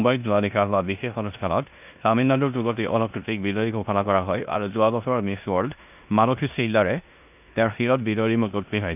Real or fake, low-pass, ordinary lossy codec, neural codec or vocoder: fake; 3.6 kHz; none; codec, 16 kHz in and 24 kHz out, 0.9 kbps, LongCat-Audio-Codec, four codebook decoder